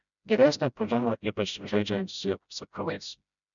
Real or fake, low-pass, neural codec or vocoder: fake; 7.2 kHz; codec, 16 kHz, 0.5 kbps, FreqCodec, smaller model